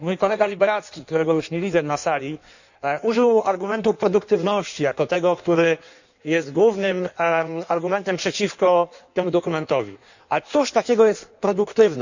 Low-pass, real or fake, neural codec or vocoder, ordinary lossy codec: 7.2 kHz; fake; codec, 16 kHz in and 24 kHz out, 1.1 kbps, FireRedTTS-2 codec; none